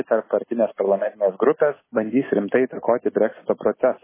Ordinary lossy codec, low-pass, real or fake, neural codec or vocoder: MP3, 16 kbps; 3.6 kHz; real; none